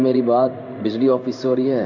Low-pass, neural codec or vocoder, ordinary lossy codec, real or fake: 7.2 kHz; codec, 16 kHz in and 24 kHz out, 1 kbps, XY-Tokenizer; none; fake